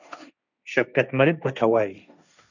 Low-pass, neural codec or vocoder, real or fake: 7.2 kHz; codec, 16 kHz, 1.1 kbps, Voila-Tokenizer; fake